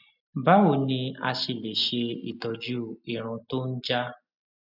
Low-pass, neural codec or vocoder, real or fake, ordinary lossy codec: 5.4 kHz; none; real; none